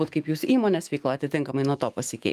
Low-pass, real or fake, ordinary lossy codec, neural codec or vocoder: 14.4 kHz; fake; Opus, 32 kbps; autoencoder, 48 kHz, 128 numbers a frame, DAC-VAE, trained on Japanese speech